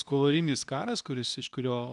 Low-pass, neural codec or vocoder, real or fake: 10.8 kHz; codec, 24 kHz, 0.9 kbps, WavTokenizer, medium speech release version 2; fake